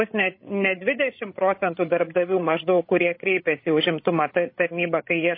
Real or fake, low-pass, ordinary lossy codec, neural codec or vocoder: fake; 5.4 kHz; MP3, 24 kbps; codec, 16 kHz, 16 kbps, FreqCodec, larger model